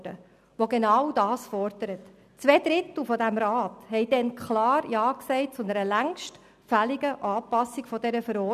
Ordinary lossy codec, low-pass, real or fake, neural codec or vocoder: none; 14.4 kHz; real; none